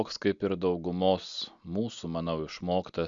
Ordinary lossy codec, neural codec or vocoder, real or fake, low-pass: Opus, 64 kbps; none; real; 7.2 kHz